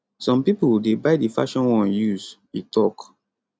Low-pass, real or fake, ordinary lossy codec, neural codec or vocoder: none; real; none; none